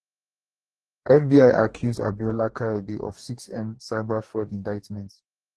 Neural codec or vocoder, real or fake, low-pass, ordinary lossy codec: codec, 44.1 kHz, 2.6 kbps, DAC; fake; 10.8 kHz; Opus, 16 kbps